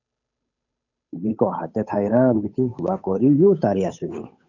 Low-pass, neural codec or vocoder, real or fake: 7.2 kHz; codec, 16 kHz, 8 kbps, FunCodec, trained on Chinese and English, 25 frames a second; fake